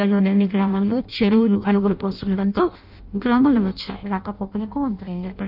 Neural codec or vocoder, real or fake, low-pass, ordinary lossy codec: codec, 16 kHz in and 24 kHz out, 0.6 kbps, FireRedTTS-2 codec; fake; 5.4 kHz; none